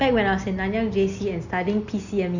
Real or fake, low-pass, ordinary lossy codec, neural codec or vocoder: real; 7.2 kHz; none; none